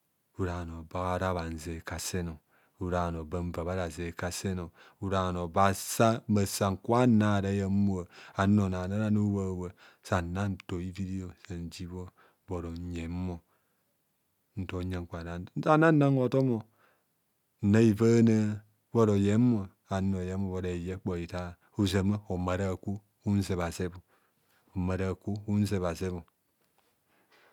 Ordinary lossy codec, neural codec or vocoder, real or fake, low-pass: none; none; real; 19.8 kHz